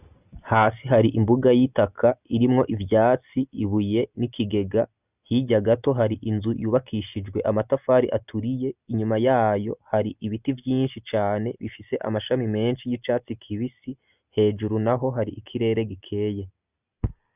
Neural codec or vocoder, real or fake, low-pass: none; real; 3.6 kHz